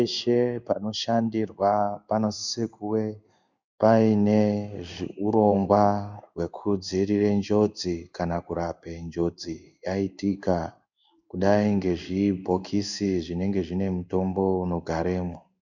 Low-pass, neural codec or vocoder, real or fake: 7.2 kHz; codec, 16 kHz in and 24 kHz out, 1 kbps, XY-Tokenizer; fake